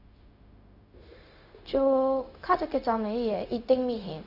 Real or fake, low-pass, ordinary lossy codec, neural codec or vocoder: fake; 5.4 kHz; Opus, 64 kbps; codec, 16 kHz, 0.4 kbps, LongCat-Audio-Codec